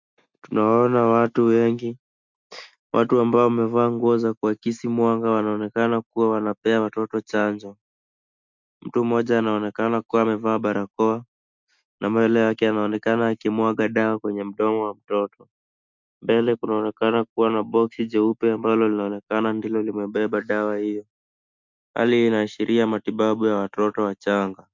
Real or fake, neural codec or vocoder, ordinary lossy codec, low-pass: real; none; MP3, 64 kbps; 7.2 kHz